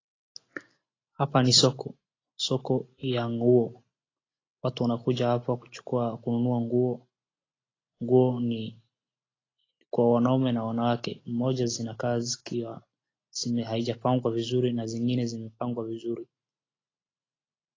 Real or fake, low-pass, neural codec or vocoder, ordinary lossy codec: real; 7.2 kHz; none; AAC, 32 kbps